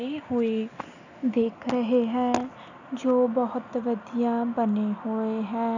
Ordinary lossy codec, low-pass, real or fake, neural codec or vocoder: none; 7.2 kHz; real; none